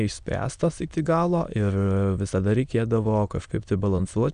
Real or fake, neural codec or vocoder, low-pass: fake; autoencoder, 22.05 kHz, a latent of 192 numbers a frame, VITS, trained on many speakers; 9.9 kHz